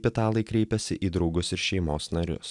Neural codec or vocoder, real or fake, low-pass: none; real; 10.8 kHz